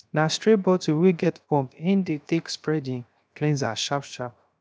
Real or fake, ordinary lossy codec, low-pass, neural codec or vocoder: fake; none; none; codec, 16 kHz, 0.7 kbps, FocalCodec